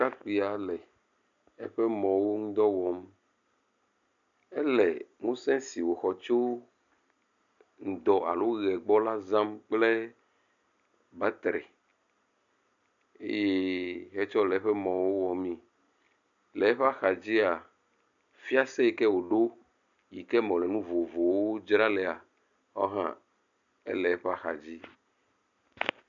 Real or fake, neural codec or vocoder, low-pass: real; none; 7.2 kHz